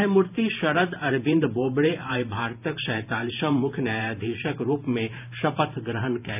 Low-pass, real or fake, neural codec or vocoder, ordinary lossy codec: 3.6 kHz; fake; vocoder, 44.1 kHz, 128 mel bands every 512 samples, BigVGAN v2; none